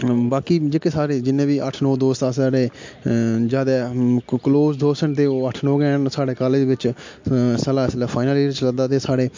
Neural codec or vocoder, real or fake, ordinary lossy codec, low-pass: none; real; MP3, 48 kbps; 7.2 kHz